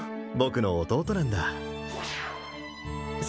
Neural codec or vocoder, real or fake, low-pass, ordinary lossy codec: none; real; none; none